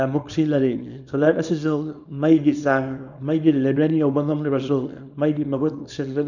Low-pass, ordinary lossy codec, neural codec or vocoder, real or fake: 7.2 kHz; none; codec, 24 kHz, 0.9 kbps, WavTokenizer, small release; fake